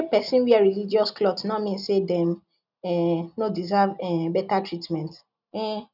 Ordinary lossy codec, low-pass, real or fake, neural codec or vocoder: none; 5.4 kHz; real; none